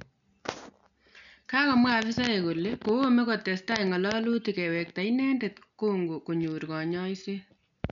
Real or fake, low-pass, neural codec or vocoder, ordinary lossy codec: real; 7.2 kHz; none; none